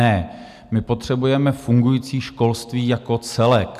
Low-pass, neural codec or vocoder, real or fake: 14.4 kHz; none; real